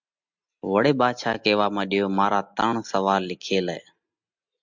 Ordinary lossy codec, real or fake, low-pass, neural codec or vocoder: MP3, 64 kbps; real; 7.2 kHz; none